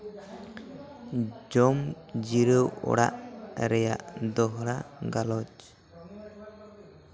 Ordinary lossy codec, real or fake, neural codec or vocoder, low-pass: none; real; none; none